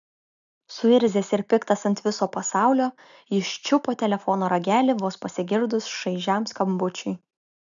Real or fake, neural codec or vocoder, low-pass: real; none; 7.2 kHz